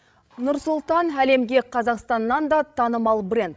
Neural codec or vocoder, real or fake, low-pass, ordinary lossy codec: codec, 16 kHz, 16 kbps, FreqCodec, larger model; fake; none; none